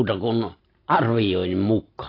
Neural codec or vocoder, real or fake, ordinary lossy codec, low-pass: none; real; AAC, 24 kbps; 5.4 kHz